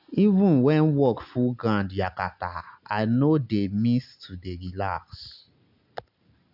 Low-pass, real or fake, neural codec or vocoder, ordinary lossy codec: 5.4 kHz; real; none; none